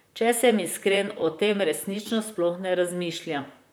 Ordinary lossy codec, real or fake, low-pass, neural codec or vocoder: none; fake; none; vocoder, 44.1 kHz, 128 mel bands, Pupu-Vocoder